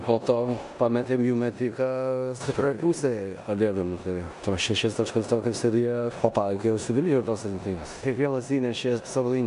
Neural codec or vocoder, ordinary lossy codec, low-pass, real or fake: codec, 16 kHz in and 24 kHz out, 0.9 kbps, LongCat-Audio-Codec, four codebook decoder; MP3, 96 kbps; 10.8 kHz; fake